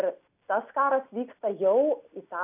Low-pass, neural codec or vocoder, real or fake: 3.6 kHz; none; real